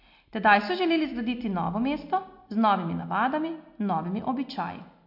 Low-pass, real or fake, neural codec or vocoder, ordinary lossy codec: 5.4 kHz; real; none; MP3, 48 kbps